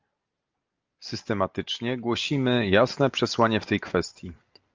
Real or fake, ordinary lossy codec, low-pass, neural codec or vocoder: real; Opus, 24 kbps; 7.2 kHz; none